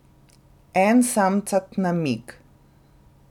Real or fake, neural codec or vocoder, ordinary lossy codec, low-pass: real; none; none; 19.8 kHz